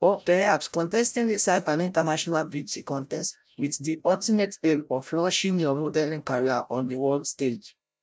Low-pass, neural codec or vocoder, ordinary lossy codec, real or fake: none; codec, 16 kHz, 0.5 kbps, FreqCodec, larger model; none; fake